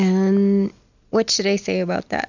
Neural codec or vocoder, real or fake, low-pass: none; real; 7.2 kHz